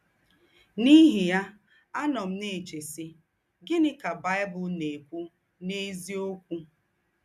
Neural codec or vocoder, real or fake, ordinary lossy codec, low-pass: none; real; none; 14.4 kHz